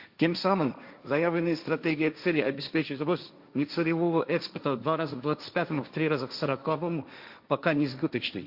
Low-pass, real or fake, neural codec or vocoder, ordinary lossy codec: 5.4 kHz; fake; codec, 16 kHz, 1.1 kbps, Voila-Tokenizer; Opus, 64 kbps